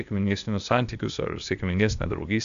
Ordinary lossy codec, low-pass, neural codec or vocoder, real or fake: MP3, 96 kbps; 7.2 kHz; codec, 16 kHz, 0.8 kbps, ZipCodec; fake